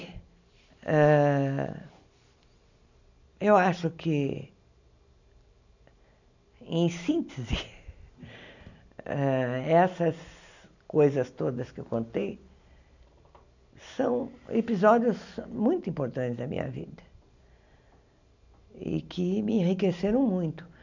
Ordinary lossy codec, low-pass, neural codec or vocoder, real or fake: none; 7.2 kHz; none; real